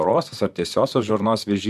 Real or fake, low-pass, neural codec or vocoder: fake; 14.4 kHz; vocoder, 44.1 kHz, 128 mel bands, Pupu-Vocoder